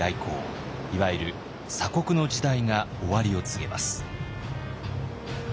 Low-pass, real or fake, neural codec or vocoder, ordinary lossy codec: none; real; none; none